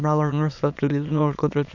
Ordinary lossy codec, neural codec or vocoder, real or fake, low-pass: none; autoencoder, 22.05 kHz, a latent of 192 numbers a frame, VITS, trained on many speakers; fake; 7.2 kHz